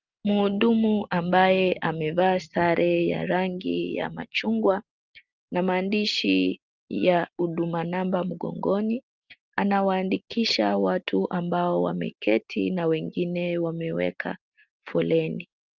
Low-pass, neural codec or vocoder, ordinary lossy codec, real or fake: 7.2 kHz; none; Opus, 32 kbps; real